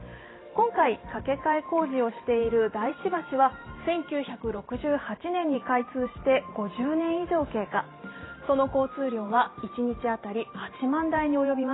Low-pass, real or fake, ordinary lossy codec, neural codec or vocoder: 7.2 kHz; fake; AAC, 16 kbps; vocoder, 44.1 kHz, 128 mel bands every 512 samples, BigVGAN v2